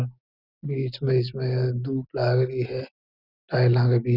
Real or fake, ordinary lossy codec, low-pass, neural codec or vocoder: real; none; 5.4 kHz; none